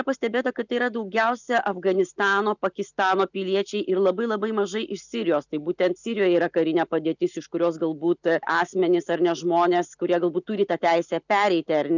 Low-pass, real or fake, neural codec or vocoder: 7.2 kHz; real; none